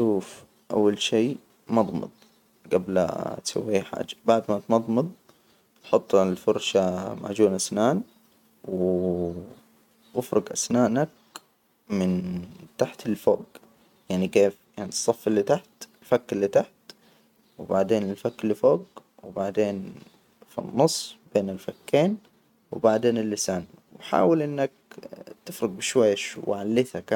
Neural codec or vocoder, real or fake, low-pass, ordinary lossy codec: none; real; 19.8 kHz; none